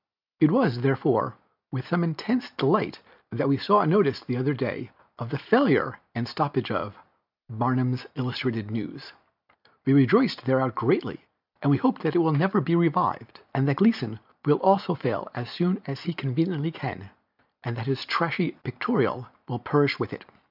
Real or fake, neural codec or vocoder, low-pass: real; none; 5.4 kHz